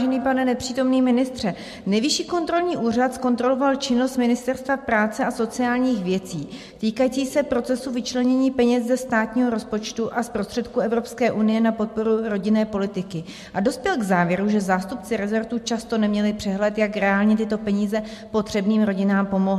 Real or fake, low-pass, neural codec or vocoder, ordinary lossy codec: real; 14.4 kHz; none; MP3, 64 kbps